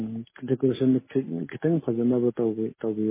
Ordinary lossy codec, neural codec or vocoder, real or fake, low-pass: MP3, 16 kbps; none; real; 3.6 kHz